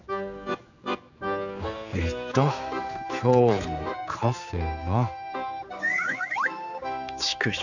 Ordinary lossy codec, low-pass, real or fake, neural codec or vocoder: none; 7.2 kHz; fake; codec, 16 kHz, 4 kbps, X-Codec, HuBERT features, trained on general audio